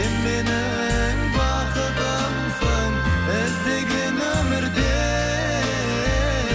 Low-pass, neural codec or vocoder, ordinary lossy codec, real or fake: none; none; none; real